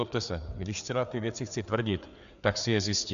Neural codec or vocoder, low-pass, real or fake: codec, 16 kHz, 4 kbps, FreqCodec, larger model; 7.2 kHz; fake